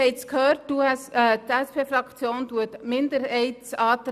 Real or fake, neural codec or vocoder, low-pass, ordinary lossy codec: real; none; 14.4 kHz; none